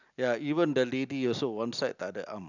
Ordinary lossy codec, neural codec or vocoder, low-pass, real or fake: none; none; 7.2 kHz; real